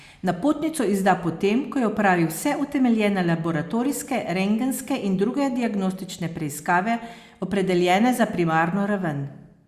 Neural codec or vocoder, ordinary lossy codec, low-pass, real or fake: none; Opus, 64 kbps; 14.4 kHz; real